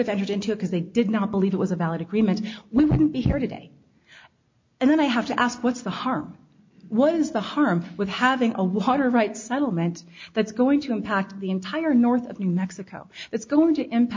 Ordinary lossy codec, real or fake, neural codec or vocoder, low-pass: MP3, 48 kbps; real; none; 7.2 kHz